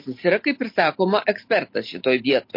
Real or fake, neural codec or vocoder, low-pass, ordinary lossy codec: real; none; 5.4 kHz; MP3, 32 kbps